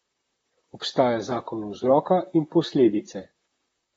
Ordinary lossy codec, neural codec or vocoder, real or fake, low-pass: AAC, 24 kbps; vocoder, 44.1 kHz, 128 mel bands, Pupu-Vocoder; fake; 19.8 kHz